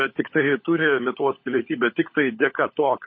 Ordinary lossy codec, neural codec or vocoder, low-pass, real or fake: MP3, 24 kbps; codec, 16 kHz, 16 kbps, FunCodec, trained on LibriTTS, 50 frames a second; 7.2 kHz; fake